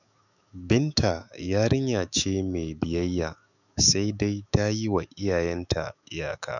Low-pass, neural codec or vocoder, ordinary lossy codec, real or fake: 7.2 kHz; autoencoder, 48 kHz, 128 numbers a frame, DAC-VAE, trained on Japanese speech; none; fake